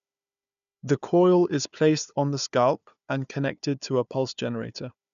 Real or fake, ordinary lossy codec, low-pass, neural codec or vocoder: fake; none; 7.2 kHz; codec, 16 kHz, 4 kbps, FunCodec, trained on Chinese and English, 50 frames a second